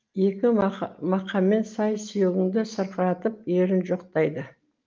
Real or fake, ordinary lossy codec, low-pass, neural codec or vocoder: real; Opus, 24 kbps; 7.2 kHz; none